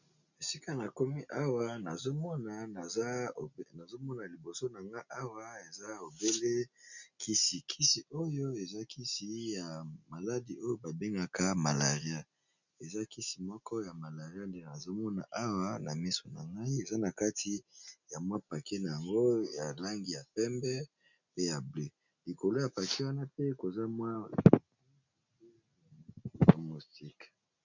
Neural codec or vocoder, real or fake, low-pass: none; real; 7.2 kHz